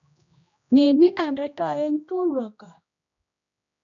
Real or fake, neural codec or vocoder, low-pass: fake; codec, 16 kHz, 1 kbps, X-Codec, HuBERT features, trained on general audio; 7.2 kHz